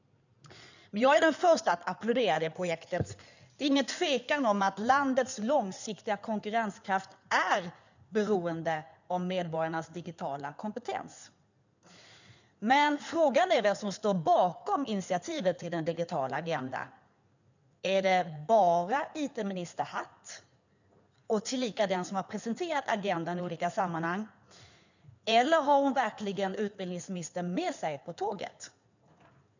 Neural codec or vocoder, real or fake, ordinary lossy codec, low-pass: codec, 16 kHz in and 24 kHz out, 2.2 kbps, FireRedTTS-2 codec; fake; none; 7.2 kHz